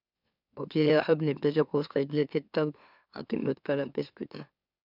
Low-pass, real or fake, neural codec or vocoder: 5.4 kHz; fake; autoencoder, 44.1 kHz, a latent of 192 numbers a frame, MeloTTS